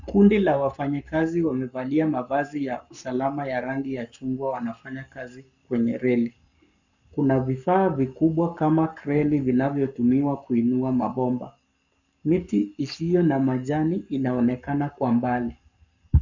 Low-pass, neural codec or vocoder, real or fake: 7.2 kHz; codec, 44.1 kHz, 7.8 kbps, Pupu-Codec; fake